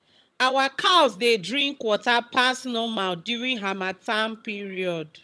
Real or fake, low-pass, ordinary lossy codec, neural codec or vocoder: fake; none; none; vocoder, 22.05 kHz, 80 mel bands, HiFi-GAN